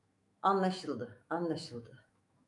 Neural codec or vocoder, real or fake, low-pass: autoencoder, 48 kHz, 128 numbers a frame, DAC-VAE, trained on Japanese speech; fake; 10.8 kHz